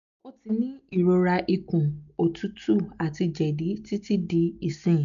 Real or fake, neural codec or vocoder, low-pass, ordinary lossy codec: real; none; 7.2 kHz; none